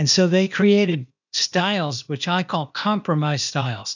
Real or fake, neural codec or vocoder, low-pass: fake; codec, 16 kHz, 0.8 kbps, ZipCodec; 7.2 kHz